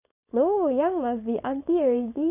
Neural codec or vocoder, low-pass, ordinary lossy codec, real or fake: codec, 16 kHz, 4.8 kbps, FACodec; 3.6 kHz; none; fake